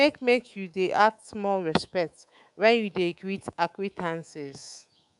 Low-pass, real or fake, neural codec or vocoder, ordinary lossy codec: 10.8 kHz; fake; codec, 24 kHz, 3.1 kbps, DualCodec; none